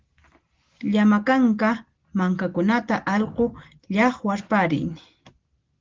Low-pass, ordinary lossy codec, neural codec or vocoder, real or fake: 7.2 kHz; Opus, 16 kbps; none; real